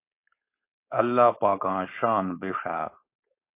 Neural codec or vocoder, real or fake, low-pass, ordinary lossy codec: codec, 16 kHz, 4.8 kbps, FACodec; fake; 3.6 kHz; MP3, 24 kbps